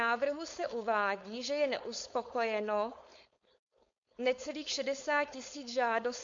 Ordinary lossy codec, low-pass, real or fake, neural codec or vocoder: MP3, 48 kbps; 7.2 kHz; fake; codec, 16 kHz, 4.8 kbps, FACodec